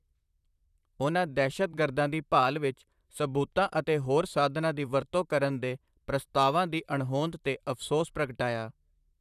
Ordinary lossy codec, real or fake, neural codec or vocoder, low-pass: none; fake; vocoder, 44.1 kHz, 128 mel bands, Pupu-Vocoder; 14.4 kHz